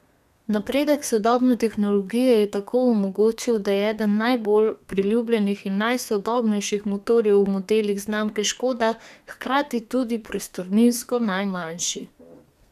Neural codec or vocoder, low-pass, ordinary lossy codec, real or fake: codec, 32 kHz, 1.9 kbps, SNAC; 14.4 kHz; none; fake